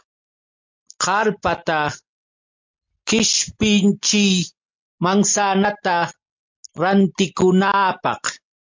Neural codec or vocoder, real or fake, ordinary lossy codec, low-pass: none; real; MP3, 64 kbps; 7.2 kHz